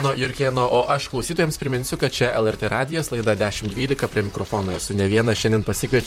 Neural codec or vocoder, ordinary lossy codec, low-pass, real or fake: vocoder, 44.1 kHz, 128 mel bands, Pupu-Vocoder; MP3, 64 kbps; 19.8 kHz; fake